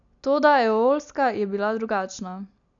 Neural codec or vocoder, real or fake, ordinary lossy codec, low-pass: none; real; none; 7.2 kHz